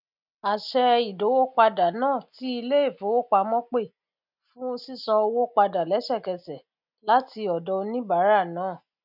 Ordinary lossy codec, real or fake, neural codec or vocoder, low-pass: none; real; none; 5.4 kHz